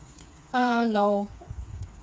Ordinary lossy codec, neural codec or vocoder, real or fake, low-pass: none; codec, 16 kHz, 4 kbps, FreqCodec, smaller model; fake; none